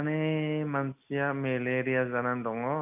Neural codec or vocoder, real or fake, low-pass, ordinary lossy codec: none; real; 3.6 kHz; none